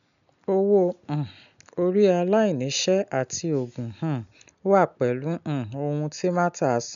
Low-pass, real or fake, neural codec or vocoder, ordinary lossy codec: 7.2 kHz; real; none; none